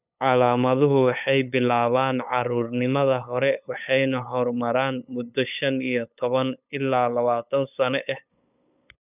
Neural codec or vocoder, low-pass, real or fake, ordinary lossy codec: codec, 16 kHz, 8 kbps, FunCodec, trained on LibriTTS, 25 frames a second; 3.6 kHz; fake; none